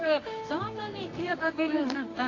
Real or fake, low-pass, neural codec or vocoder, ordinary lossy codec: fake; 7.2 kHz; codec, 24 kHz, 0.9 kbps, WavTokenizer, medium music audio release; AAC, 32 kbps